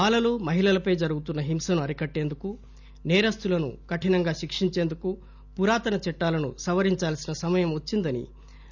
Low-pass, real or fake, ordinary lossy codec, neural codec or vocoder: 7.2 kHz; real; none; none